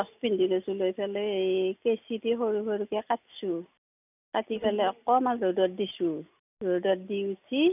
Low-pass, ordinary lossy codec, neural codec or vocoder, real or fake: 3.6 kHz; none; none; real